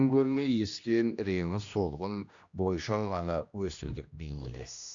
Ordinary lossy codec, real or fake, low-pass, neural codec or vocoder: Opus, 64 kbps; fake; 7.2 kHz; codec, 16 kHz, 1 kbps, X-Codec, HuBERT features, trained on general audio